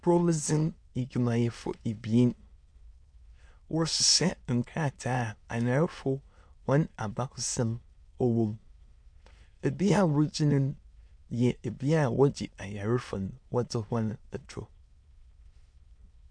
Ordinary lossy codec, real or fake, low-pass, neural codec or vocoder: MP3, 64 kbps; fake; 9.9 kHz; autoencoder, 22.05 kHz, a latent of 192 numbers a frame, VITS, trained on many speakers